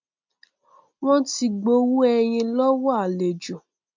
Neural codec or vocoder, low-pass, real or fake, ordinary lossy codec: none; 7.2 kHz; real; MP3, 64 kbps